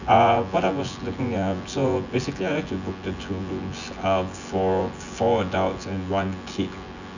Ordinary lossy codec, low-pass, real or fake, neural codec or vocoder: none; 7.2 kHz; fake; vocoder, 24 kHz, 100 mel bands, Vocos